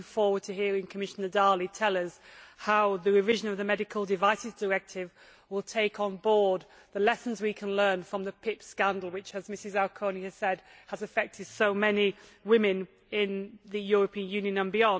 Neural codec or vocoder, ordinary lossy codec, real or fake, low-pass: none; none; real; none